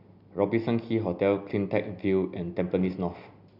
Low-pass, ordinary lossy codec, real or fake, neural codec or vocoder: 5.4 kHz; none; real; none